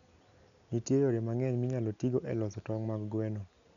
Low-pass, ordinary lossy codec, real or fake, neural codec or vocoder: 7.2 kHz; none; real; none